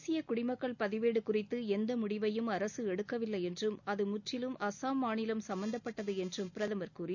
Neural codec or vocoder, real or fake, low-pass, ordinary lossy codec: none; real; 7.2 kHz; none